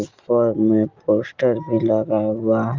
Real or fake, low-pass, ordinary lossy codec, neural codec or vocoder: real; 7.2 kHz; Opus, 32 kbps; none